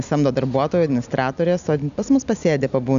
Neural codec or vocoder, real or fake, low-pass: none; real; 7.2 kHz